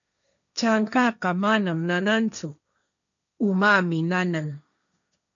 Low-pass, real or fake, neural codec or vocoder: 7.2 kHz; fake; codec, 16 kHz, 1.1 kbps, Voila-Tokenizer